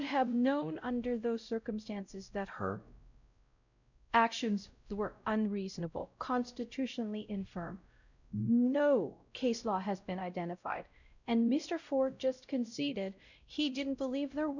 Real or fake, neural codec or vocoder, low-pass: fake; codec, 16 kHz, 0.5 kbps, X-Codec, WavLM features, trained on Multilingual LibriSpeech; 7.2 kHz